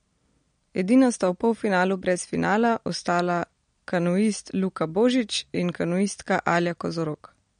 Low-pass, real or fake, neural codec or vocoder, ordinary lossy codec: 9.9 kHz; real; none; MP3, 48 kbps